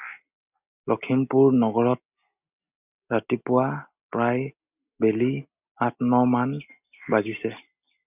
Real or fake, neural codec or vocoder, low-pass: real; none; 3.6 kHz